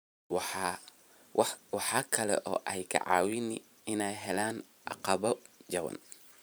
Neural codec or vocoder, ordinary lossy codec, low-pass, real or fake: vocoder, 44.1 kHz, 128 mel bands every 512 samples, BigVGAN v2; none; none; fake